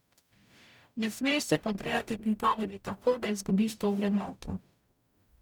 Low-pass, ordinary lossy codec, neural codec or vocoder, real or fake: 19.8 kHz; none; codec, 44.1 kHz, 0.9 kbps, DAC; fake